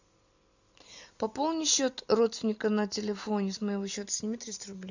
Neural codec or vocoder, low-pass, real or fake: none; 7.2 kHz; real